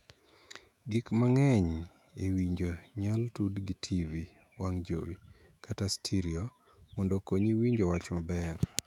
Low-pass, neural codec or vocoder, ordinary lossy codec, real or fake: 19.8 kHz; autoencoder, 48 kHz, 128 numbers a frame, DAC-VAE, trained on Japanese speech; none; fake